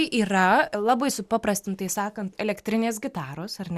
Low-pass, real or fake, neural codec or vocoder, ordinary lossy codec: 14.4 kHz; real; none; Opus, 64 kbps